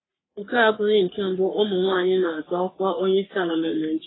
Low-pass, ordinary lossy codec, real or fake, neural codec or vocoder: 7.2 kHz; AAC, 16 kbps; fake; codec, 44.1 kHz, 3.4 kbps, Pupu-Codec